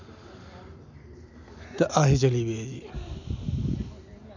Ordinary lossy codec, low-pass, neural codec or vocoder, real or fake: none; 7.2 kHz; none; real